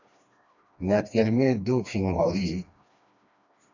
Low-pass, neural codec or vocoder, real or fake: 7.2 kHz; codec, 16 kHz, 2 kbps, FreqCodec, smaller model; fake